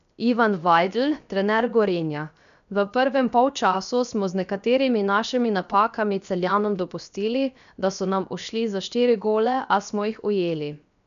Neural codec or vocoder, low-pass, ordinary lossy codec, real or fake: codec, 16 kHz, 0.7 kbps, FocalCodec; 7.2 kHz; none; fake